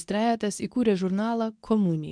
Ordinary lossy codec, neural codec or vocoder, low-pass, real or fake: MP3, 96 kbps; codec, 24 kHz, 0.9 kbps, WavTokenizer, medium speech release version 2; 9.9 kHz; fake